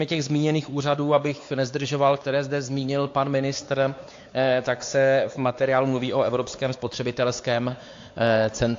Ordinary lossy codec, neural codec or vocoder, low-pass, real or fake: AAC, 48 kbps; codec, 16 kHz, 4 kbps, X-Codec, WavLM features, trained on Multilingual LibriSpeech; 7.2 kHz; fake